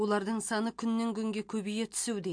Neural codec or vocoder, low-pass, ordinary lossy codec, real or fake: none; 9.9 kHz; MP3, 48 kbps; real